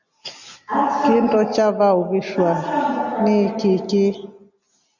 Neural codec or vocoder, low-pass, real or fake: none; 7.2 kHz; real